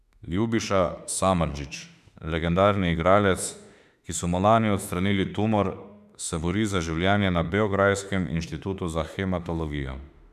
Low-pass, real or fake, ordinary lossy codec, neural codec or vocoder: 14.4 kHz; fake; none; autoencoder, 48 kHz, 32 numbers a frame, DAC-VAE, trained on Japanese speech